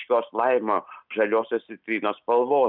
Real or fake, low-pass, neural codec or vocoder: real; 5.4 kHz; none